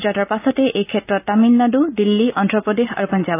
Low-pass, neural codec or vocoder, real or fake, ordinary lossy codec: 3.6 kHz; none; real; AAC, 24 kbps